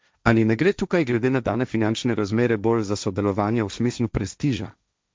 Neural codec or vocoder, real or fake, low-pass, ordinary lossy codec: codec, 16 kHz, 1.1 kbps, Voila-Tokenizer; fake; none; none